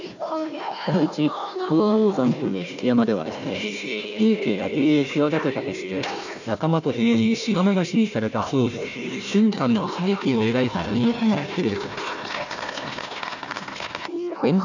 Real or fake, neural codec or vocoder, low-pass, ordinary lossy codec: fake; codec, 16 kHz, 1 kbps, FunCodec, trained on Chinese and English, 50 frames a second; 7.2 kHz; none